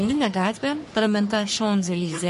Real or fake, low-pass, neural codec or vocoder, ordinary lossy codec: fake; 14.4 kHz; codec, 44.1 kHz, 3.4 kbps, Pupu-Codec; MP3, 48 kbps